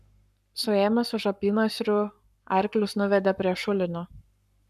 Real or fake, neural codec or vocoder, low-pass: fake; codec, 44.1 kHz, 7.8 kbps, Pupu-Codec; 14.4 kHz